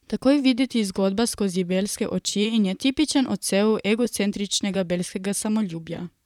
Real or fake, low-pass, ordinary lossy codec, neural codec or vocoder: fake; 19.8 kHz; none; vocoder, 44.1 kHz, 128 mel bands, Pupu-Vocoder